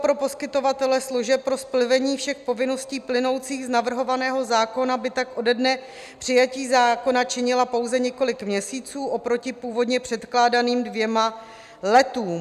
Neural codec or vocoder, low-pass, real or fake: none; 14.4 kHz; real